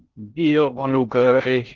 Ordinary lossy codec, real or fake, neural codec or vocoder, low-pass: Opus, 16 kbps; fake; codec, 16 kHz in and 24 kHz out, 0.6 kbps, FocalCodec, streaming, 2048 codes; 7.2 kHz